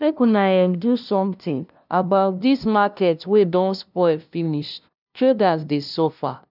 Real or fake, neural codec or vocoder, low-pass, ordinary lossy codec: fake; codec, 16 kHz, 0.5 kbps, FunCodec, trained on LibriTTS, 25 frames a second; 5.4 kHz; none